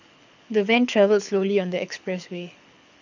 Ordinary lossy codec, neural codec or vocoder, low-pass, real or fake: none; codec, 24 kHz, 6 kbps, HILCodec; 7.2 kHz; fake